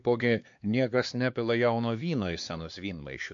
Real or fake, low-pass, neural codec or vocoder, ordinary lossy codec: fake; 7.2 kHz; codec, 16 kHz, 2 kbps, X-Codec, HuBERT features, trained on LibriSpeech; MP3, 48 kbps